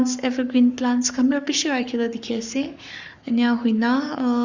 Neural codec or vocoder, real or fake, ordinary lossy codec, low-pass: codec, 44.1 kHz, 7.8 kbps, Pupu-Codec; fake; Opus, 64 kbps; 7.2 kHz